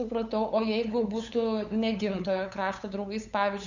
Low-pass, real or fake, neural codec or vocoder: 7.2 kHz; fake; codec, 16 kHz, 8 kbps, FunCodec, trained on LibriTTS, 25 frames a second